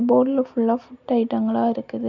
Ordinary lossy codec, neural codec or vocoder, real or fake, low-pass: none; none; real; 7.2 kHz